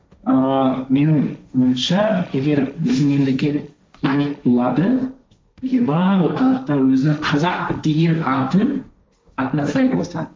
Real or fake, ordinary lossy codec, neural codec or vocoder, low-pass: fake; none; codec, 16 kHz, 1.1 kbps, Voila-Tokenizer; none